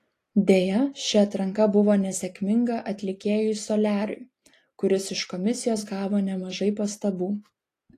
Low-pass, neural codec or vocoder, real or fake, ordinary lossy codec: 14.4 kHz; none; real; AAC, 48 kbps